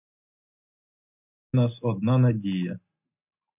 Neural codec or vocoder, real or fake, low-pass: none; real; 3.6 kHz